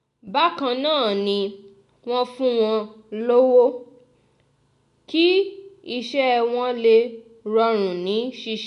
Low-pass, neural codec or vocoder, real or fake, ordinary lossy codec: 10.8 kHz; none; real; none